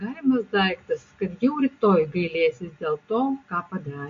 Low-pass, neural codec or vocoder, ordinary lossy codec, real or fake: 7.2 kHz; none; MP3, 48 kbps; real